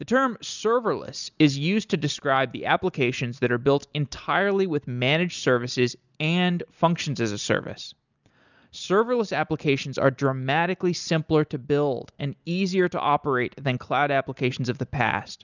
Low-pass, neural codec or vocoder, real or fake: 7.2 kHz; none; real